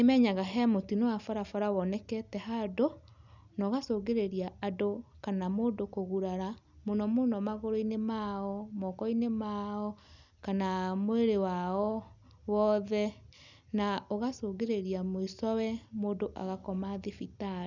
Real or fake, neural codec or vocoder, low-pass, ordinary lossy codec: real; none; 7.2 kHz; none